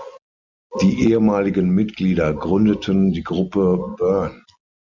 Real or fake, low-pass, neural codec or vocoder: real; 7.2 kHz; none